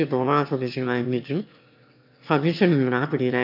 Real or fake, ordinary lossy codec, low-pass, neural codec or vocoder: fake; MP3, 48 kbps; 5.4 kHz; autoencoder, 22.05 kHz, a latent of 192 numbers a frame, VITS, trained on one speaker